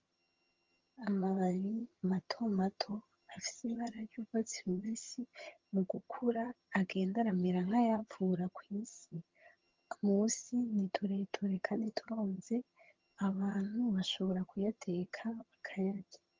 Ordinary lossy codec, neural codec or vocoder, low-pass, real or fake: Opus, 32 kbps; vocoder, 22.05 kHz, 80 mel bands, HiFi-GAN; 7.2 kHz; fake